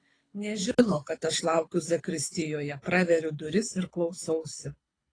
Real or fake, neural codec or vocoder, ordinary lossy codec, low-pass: fake; codec, 24 kHz, 6 kbps, HILCodec; AAC, 32 kbps; 9.9 kHz